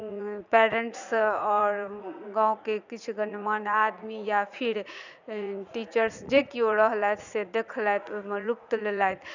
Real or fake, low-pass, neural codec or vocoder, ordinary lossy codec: fake; 7.2 kHz; vocoder, 44.1 kHz, 80 mel bands, Vocos; none